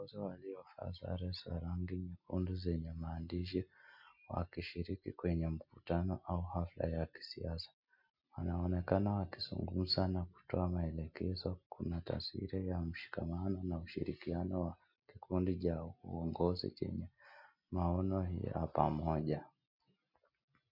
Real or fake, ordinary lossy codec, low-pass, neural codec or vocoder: real; MP3, 48 kbps; 5.4 kHz; none